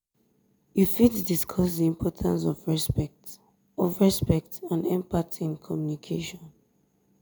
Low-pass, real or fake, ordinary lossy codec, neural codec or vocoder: none; real; none; none